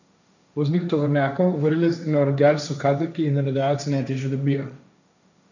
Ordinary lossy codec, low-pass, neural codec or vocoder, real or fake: none; 7.2 kHz; codec, 16 kHz, 1.1 kbps, Voila-Tokenizer; fake